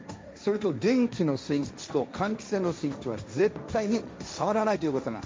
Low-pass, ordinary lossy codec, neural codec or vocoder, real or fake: none; none; codec, 16 kHz, 1.1 kbps, Voila-Tokenizer; fake